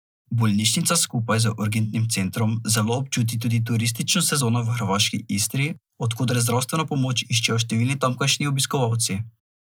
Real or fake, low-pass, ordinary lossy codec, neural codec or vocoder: real; none; none; none